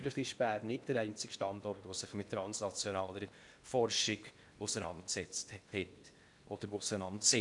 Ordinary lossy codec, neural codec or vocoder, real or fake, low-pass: none; codec, 16 kHz in and 24 kHz out, 0.8 kbps, FocalCodec, streaming, 65536 codes; fake; 10.8 kHz